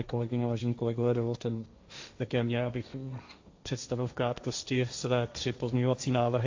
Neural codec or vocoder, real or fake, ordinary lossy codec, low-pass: codec, 16 kHz, 1.1 kbps, Voila-Tokenizer; fake; AAC, 48 kbps; 7.2 kHz